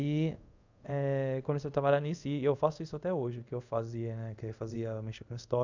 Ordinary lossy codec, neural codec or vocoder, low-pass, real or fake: none; codec, 24 kHz, 0.5 kbps, DualCodec; 7.2 kHz; fake